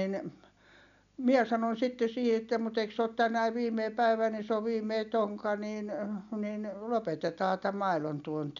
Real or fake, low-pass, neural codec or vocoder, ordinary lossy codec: real; 7.2 kHz; none; none